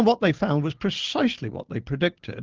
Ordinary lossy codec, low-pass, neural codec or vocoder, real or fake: Opus, 16 kbps; 7.2 kHz; none; real